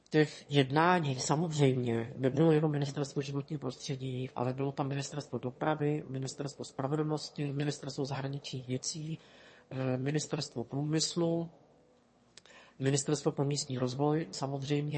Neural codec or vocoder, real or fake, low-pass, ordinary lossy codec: autoencoder, 22.05 kHz, a latent of 192 numbers a frame, VITS, trained on one speaker; fake; 9.9 kHz; MP3, 32 kbps